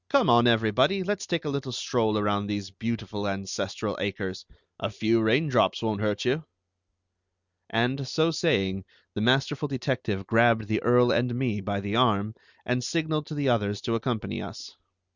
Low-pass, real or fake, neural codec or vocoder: 7.2 kHz; real; none